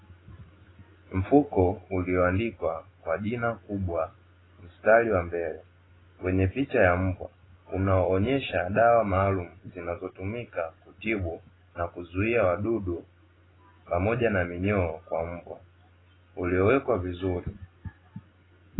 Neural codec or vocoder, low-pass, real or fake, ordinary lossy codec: none; 7.2 kHz; real; AAC, 16 kbps